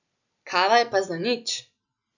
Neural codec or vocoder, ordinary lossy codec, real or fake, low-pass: none; none; real; 7.2 kHz